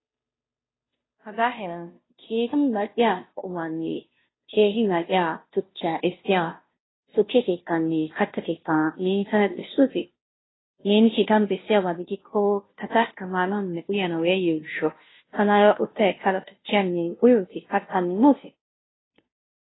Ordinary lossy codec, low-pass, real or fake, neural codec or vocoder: AAC, 16 kbps; 7.2 kHz; fake; codec, 16 kHz, 0.5 kbps, FunCodec, trained on Chinese and English, 25 frames a second